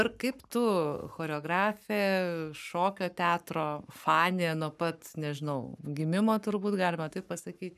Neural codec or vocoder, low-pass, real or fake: codec, 44.1 kHz, 7.8 kbps, Pupu-Codec; 14.4 kHz; fake